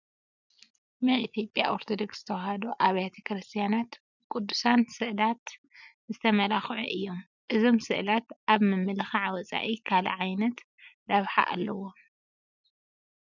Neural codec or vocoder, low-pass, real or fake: none; 7.2 kHz; real